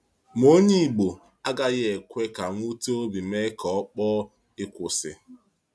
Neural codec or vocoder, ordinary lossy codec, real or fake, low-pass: none; none; real; none